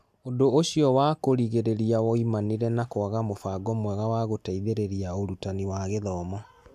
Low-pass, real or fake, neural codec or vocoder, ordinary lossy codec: 14.4 kHz; real; none; AAC, 96 kbps